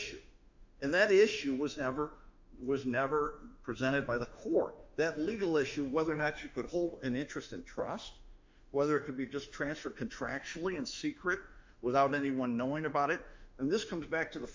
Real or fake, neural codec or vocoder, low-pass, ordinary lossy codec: fake; autoencoder, 48 kHz, 32 numbers a frame, DAC-VAE, trained on Japanese speech; 7.2 kHz; MP3, 64 kbps